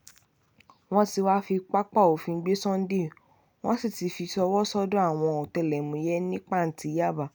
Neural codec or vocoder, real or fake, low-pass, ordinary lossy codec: none; real; none; none